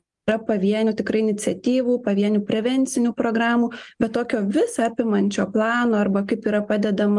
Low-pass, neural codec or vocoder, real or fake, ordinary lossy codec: 10.8 kHz; none; real; Opus, 32 kbps